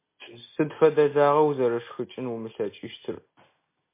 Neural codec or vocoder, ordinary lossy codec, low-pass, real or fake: none; MP3, 24 kbps; 3.6 kHz; real